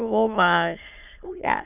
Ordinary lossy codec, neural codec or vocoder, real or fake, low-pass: none; autoencoder, 22.05 kHz, a latent of 192 numbers a frame, VITS, trained on many speakers; fake; 3.6 kHz